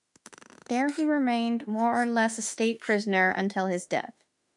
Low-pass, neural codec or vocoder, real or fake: 10.8 kHz; autoencoder, 48 kHz, 32 numbers a frame, DAC-VAE, trained on Japanese speech; fake